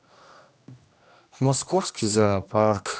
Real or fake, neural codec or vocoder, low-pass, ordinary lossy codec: fake; codec, 16 kHz, 1 kbps, X-Codec, HuBERT features, trained on general audio; none; none